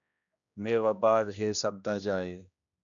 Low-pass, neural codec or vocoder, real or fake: 7.2 kHz; codec, 16 kHz, 1 kbps, X-Codec, HuBERT features, trained on general audio; fake